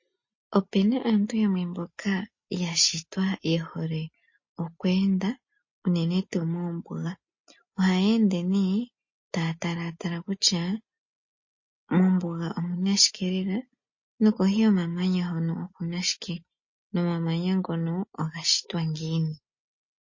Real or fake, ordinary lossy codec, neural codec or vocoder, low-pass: real; MP3, 32 kbps; none; 7.2 kHz